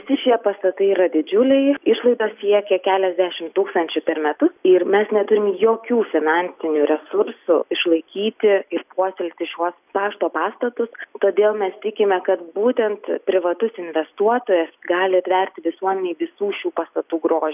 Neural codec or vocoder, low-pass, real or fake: none; 3.6 kHz; real